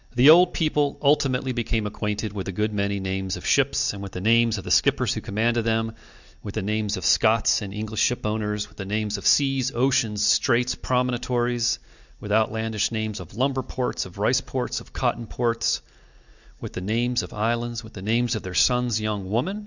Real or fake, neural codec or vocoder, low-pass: real; none; 7.2 kHz